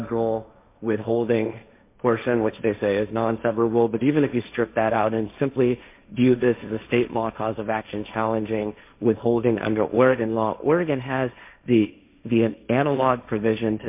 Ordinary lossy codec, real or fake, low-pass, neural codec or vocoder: MP3, 32 kbps; fake; 3.6 kHz; codec, 16 kHz, 1.1 kbps, Voila-Tokenizer